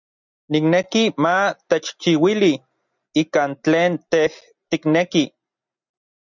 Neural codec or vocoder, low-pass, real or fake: none; 7.2 kHz; real